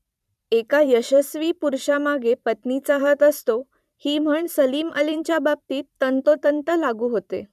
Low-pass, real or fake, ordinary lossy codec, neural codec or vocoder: 14.4 kHz; fake; none; vocoder, 44.1 kHz, 128 mel bands every 512 samples, BigVGAN v2